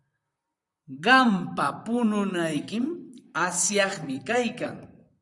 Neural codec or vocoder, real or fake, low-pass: vocoder, 44.1 kHz, 128 mel bands, Pupu-Vocoder; fake; 10.8 kHz